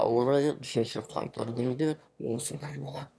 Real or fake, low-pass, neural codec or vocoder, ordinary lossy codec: fake; none; autoencoder, 22.05 kHz, a latent of 192 numbers a frame, VITS, trained on one speaker; none